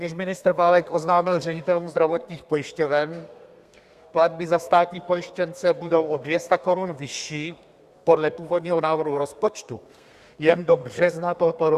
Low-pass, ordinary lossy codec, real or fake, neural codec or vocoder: 14.4 kHz; Opus, 64 kbps; fake; codec, 32 kHz, 1.9 kbps, SNAC